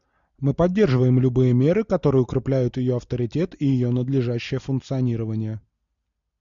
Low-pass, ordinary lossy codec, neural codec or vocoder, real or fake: 7.2 kHz; MP3, 96 kbps; none; real